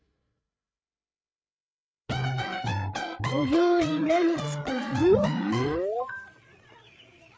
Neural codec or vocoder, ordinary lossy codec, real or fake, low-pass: codec, 16 kHz, 8 kbps, FreqCodec, larger model; none; fake; none